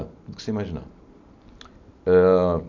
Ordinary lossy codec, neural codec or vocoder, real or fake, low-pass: none; none; real; 7.2 kHz